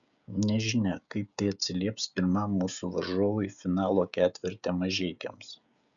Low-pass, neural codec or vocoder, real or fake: 7.2 kHz; codec, 16 kHz, 16 kbps, FreqCodec, smaller model; fake